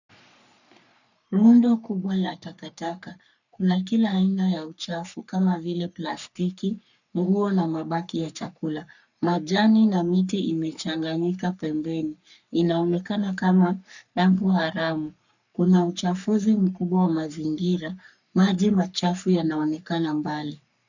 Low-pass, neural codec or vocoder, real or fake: 7.2 kHz; codec, 44.1 kHz, 3.4 kbps, Pupu-Codec; fake